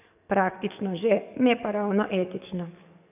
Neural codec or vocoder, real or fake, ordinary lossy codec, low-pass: codec, 44.1 kHz, 7.8 kbps, DAC; fake; none; 3.6 kHz